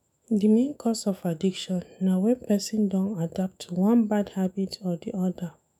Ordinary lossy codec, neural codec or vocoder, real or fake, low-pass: none; autoencoder, 48 kHz, 128 numbers a frame, DAC-VAE, trained on Japanese speech; fake; 19.8 kHz